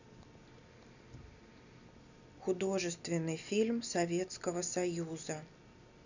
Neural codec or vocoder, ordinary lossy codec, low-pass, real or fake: none; none; 7.2 kHz; real